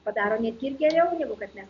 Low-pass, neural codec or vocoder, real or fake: 7.2 kHz; none; real